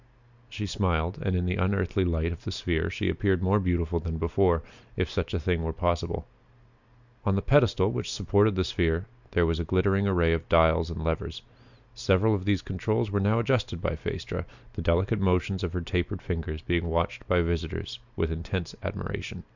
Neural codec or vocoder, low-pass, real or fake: none; 7.2 kHz; real